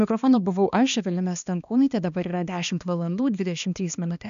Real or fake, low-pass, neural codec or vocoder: fake; 7.2 kHz; codec, 16 kHz, 2 kbps, X-Codec, HuBERT features, trained on balanced general audio